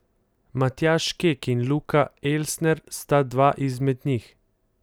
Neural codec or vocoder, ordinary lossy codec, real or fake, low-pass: none; none; real; none